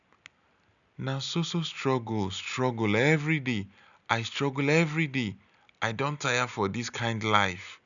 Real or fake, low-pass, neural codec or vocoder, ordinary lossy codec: real; 7.2 kHz; none; none